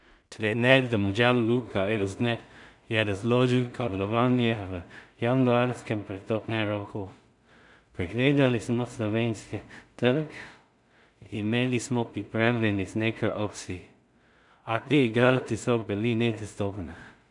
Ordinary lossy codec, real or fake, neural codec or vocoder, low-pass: none; fake; codec, 16 kHz in and 24 kHz out, 0.4 kbps, LongCat-Audio-Codec, two codebook decoder; 10.8 kHz